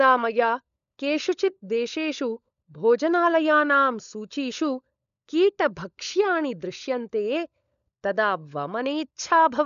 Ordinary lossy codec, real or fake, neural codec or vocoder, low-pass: none; fake; codec, 16 kHz, 16 kbps, FunCodec, trained on LibriTTS, 50 frames a second; 7.2 kHz